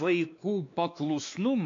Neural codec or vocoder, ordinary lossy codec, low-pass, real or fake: codec, 16 kHz, 2 kbps, X-Codec, WavLM features, trained on Multilingual LibriSpeech; MP3, 48 kbps; 7.2 kHz; fake